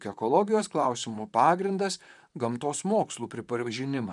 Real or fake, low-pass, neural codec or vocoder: fake; 10.8 kHz; vocoder, 44.1 kHz, 128 mel bands, Pupu-Vocoder